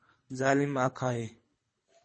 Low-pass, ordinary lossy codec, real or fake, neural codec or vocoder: 9.9 kHz; MP3, 32 kbps; fake; codec, 24 kHz, 3 kbps, HILCodec